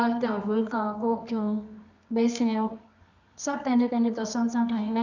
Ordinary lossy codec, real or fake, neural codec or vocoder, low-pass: none; fake; codec, 24 kHz, 0.9 kbps, WavTokenizer, medium music audio release; 7.2 kHz